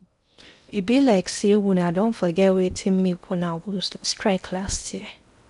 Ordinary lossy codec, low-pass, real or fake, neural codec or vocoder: none; 10.8 kHz; fake; codec, 16 kHz in and 24 kHz out, 0.8 kbps, FocalCodec, streaming, 65536 codes